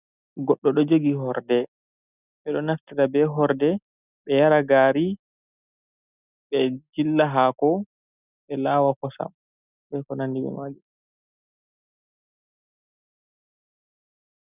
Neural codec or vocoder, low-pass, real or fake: none; 3.6 kHz; real